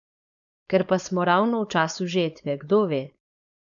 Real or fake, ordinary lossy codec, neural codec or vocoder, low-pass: fake; none; codec, 16 kHz, 4.8 kbps, FACodec; 7.2 kHz